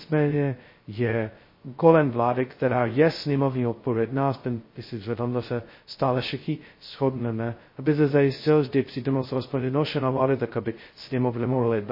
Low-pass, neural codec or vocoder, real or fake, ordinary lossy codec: 5.4 kHz; codec, 16 kHz, 0.2 kbps, FocalCodec; fake; MP3, 24 kbps